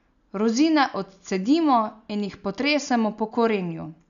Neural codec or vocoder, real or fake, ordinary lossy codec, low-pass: none; real; none; 7.2 kHz